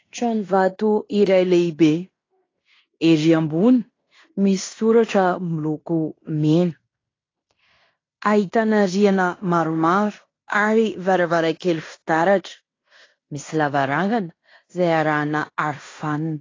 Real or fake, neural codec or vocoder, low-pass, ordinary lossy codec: fake; codec, 16 kHz in and 24 kHz out, 0.9 kbps, LongCat-Audio-Codec, fine tuned four codebook decoder; 7.2 kHz; AAC, 32 kbps